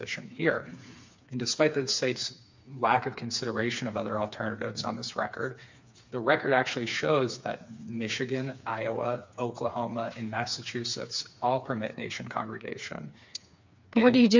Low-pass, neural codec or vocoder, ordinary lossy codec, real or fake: 7.2 kHz; codec, 16 kHz, 4 kbps, FreqCodec, smaller model; MP3, 48 kbps; fake